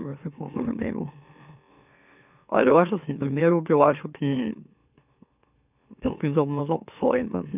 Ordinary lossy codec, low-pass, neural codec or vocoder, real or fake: none; 3.6 kHz; autoencoder, 44.1 kHz, a latent of 192 numbers a frame, MeloTTS; fake